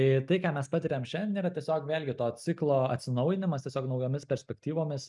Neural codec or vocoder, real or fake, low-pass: none; real; 10.8 kHz